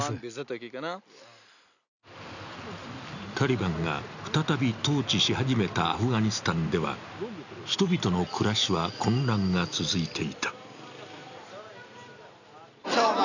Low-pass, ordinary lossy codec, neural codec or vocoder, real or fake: 7.2 kHz; none; none; real